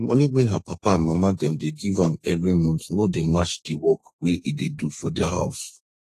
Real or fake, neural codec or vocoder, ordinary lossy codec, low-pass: fake; codec, 32 kHz, 1.9 kbps, SNAC; AAC, 48 kbps; 14.4 kHz